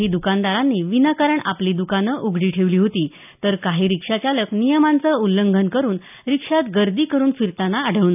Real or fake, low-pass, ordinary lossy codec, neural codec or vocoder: real; 3.6 kHz; none; none